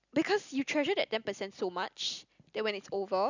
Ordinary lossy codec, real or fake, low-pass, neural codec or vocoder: none; real; 7.2 kHz; none